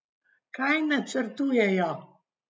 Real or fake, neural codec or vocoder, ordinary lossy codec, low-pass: real; none; none; none